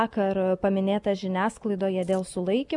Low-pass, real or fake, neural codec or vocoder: 10.8 kHz; real; none